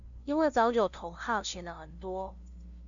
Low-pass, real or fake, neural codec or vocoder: 7.2 kHz; fake; codec, 16 kHz, 0.5 kbps, FunCodec, trained on LibriTTS, 25 frames a second